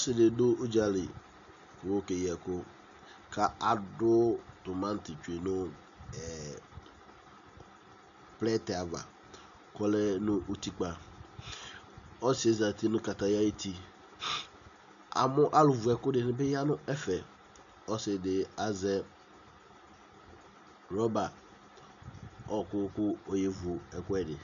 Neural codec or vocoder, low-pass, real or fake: none; 7.2 kHz; real